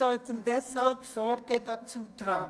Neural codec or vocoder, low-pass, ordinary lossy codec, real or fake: codec, 24 kHz, 0.9 kbps, WavTokenizer, medium music audio release; none; none; fake